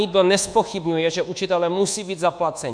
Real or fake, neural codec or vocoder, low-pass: fake; codec, 24 kHz, 1.2 kbps, DualCodec; 9.9 kHz